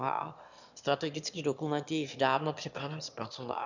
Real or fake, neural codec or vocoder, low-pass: fake; autoencoder, 22.05 kHz, a latent of 192 numbers a frame, VITS, trained on one speaker; 7.2 kHz